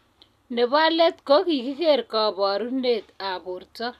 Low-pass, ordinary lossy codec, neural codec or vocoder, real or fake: 14.4 kHz; none; none; real